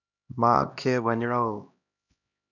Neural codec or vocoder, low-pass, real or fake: codec, 16 kHz, 1 kbps, X-Codec, HuBERT features, trained on LibriSpeech; 7.2 kHz; fake